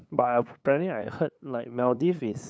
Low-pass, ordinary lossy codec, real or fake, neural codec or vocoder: none; none; fake; codec, 16 kHz, 8 kbps, FunCodec, trained on LibriTTS, 25 frames a second